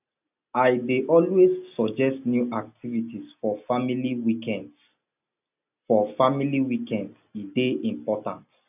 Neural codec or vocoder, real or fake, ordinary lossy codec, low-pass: none; real; none; 3.6 kHz